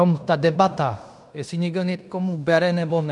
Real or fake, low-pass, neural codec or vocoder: fake; 10.8 kHz; codec, 16 kHz in and 24 kHz out, 0.9 kbps, LongCat-Audio-Codec, fine tuned four codebook decoder